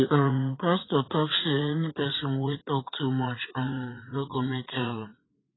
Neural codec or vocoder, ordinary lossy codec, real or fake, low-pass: vocoder, 44.1 kHz, 80 mel bands, Vocos; AAC, 16 kbps; fake; 7.2 kHz